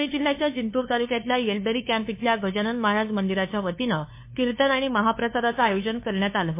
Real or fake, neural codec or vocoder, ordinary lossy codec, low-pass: fake; codec, 16 kHz, 2 kbps, FunCodec, trained on LibriTTS, 25 frames a second; MP3, 24 kbps; 3.6 kHz